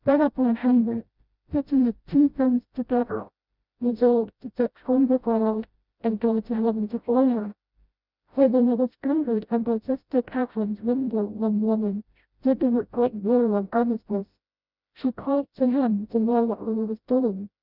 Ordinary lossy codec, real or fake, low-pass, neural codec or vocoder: Opus, 64 kbps; fake; 5.4 kHz; codec, 16 kHz, 0.5 kbps, FreqCodec, smaller model